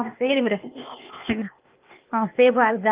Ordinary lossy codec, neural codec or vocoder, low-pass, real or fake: Opus, 32 kbps; codec, 16 kHz, 0.8 kbps, ZipCodec; 3.6 kHz; fake